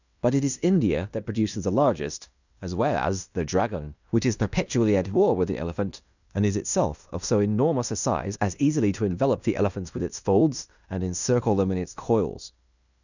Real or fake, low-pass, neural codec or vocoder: fake; 7.2 kHz; codec, 16 kHz in and 24 kHz out, 0.9 kbps, LongCat-Audio-Codec, fine tuned four codebook decoder